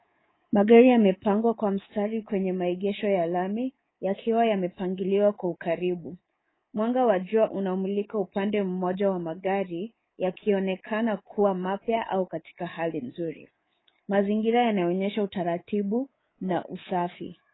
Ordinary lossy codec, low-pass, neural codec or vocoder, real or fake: AAC, 16 kbps; 7.2 kHz; codec, 24 kHz, 3.1 kbps, DualCodec; fake